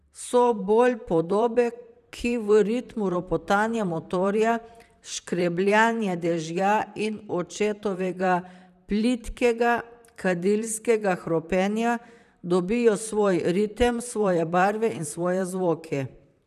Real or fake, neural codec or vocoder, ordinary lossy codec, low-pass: fake; vocoder, 44.1 kHz, 128 mel bands, Pupu-Vocoder; none; 14.4 kHz